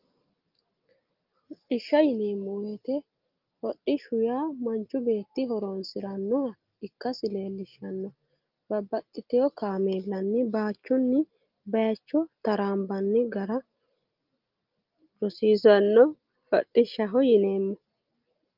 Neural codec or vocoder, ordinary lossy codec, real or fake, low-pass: none; Opus, 24 kbps; real; 5.4 kHz